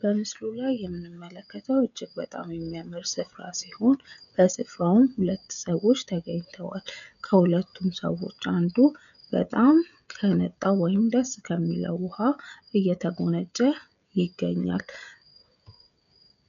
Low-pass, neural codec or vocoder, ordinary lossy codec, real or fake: 7.2 kHz; codec, 16 kHz, 16 kbps, FreqCodec, smaller model; MP3, 96 kbps; fake